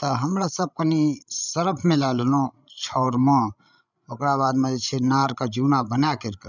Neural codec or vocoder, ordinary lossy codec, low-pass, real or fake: codec, 16 kHz, 16 kbps, FreqCodec, larger model; none; 7.2 kHz; fake